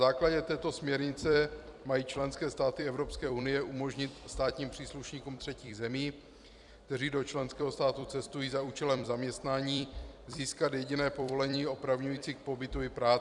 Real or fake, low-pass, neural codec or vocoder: fake; 10.8 kHz; vocoder, 44.1 kHz, 128 mel bands every 256 samples, BigVGAN v2